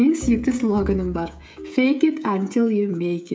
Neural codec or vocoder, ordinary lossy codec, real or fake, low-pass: codec, 16 kHz, 16 kbps, FreqCodec, smaller model; none; fake; none